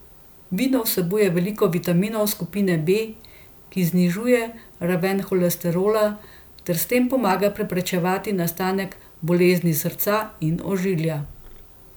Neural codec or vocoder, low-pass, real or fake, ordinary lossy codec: none; none; real; none